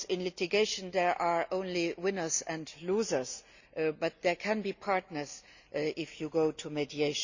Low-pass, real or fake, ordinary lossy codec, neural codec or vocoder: 7.2 kHz; real; Opus, 64 kbps; none